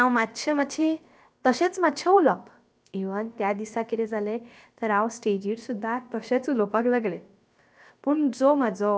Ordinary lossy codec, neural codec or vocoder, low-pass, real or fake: none; codec, 16 kHz, about 1 kbps, DyCAST, with the encoder's durations; none; fake